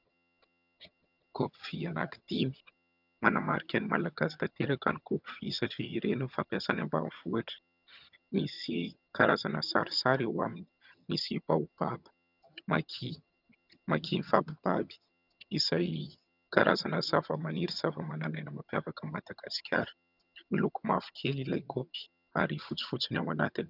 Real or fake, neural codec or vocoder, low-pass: fake; vocoder, 22.05 kHz, 80 mel bands, HiFi-GAN; 5.4 kHz